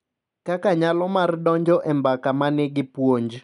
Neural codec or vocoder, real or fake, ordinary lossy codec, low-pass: none; real; MP3, 96 kbps; 10.8 kHz